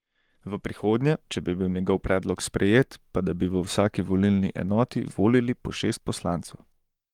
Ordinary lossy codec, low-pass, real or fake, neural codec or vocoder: Opus, 24 kbps; 19.8 kHz; fake; codec, 44.1 kHz, 7.8 kbps, Pupu-Codec